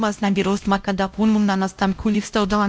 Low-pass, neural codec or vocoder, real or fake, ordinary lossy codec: none; codec, 16 kHz, 0.5 kbps, X-Codec, WavLM features, trained on Multilingual LibriSpeech; fake; none